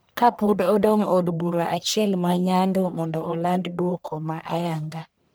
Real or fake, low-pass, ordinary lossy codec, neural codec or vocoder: fake; none; none; codec, 44.1 kHz, 1.7 kbps, Pupu-Codec